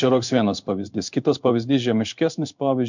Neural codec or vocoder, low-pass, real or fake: codec, 16 kHz in and 24 kHz out, 1 kbps, XY-Tokenizer; 7.2 kHz; fake